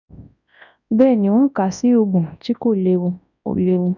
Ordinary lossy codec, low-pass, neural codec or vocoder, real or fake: none; 7.2 kHz; codec, 24 kHz, 0.9 kbps, WavTokenizer, large speech release; fake